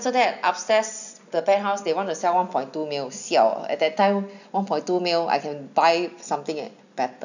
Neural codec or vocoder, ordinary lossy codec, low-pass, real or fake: none; none; 7.2 kHz; real